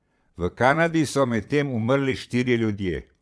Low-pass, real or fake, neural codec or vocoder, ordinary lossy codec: none; fake; vocoder, 22.05 kHz, 80 mel bands, Vocos; none